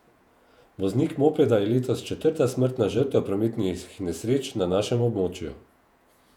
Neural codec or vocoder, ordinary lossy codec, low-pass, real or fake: vocoder, 48 kHz, 128 mel bands, Vocos; none; 19.8 kHz; fake